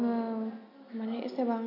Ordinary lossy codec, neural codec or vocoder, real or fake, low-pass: none; none; real; 5.4 kHz